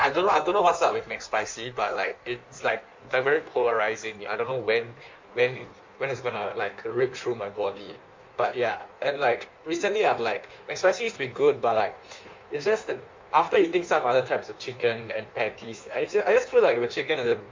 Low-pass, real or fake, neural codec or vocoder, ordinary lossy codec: 7.2 kHz; fake; codec, 16 kHz in and 24 kHz out, 1.1 kbps, FireRedTTS-2 codec; MP3, 48 kbps